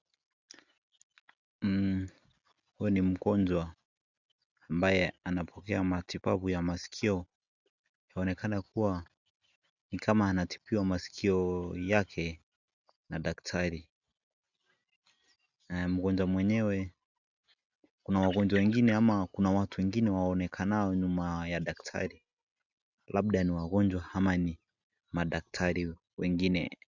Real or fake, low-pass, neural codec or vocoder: real; 7.2 kHz; none